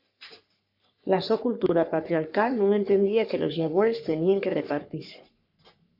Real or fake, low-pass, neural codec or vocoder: fake; 5.4 kHz; codec, 44.1 kHz, 3.4 kbps, Pupu-Codec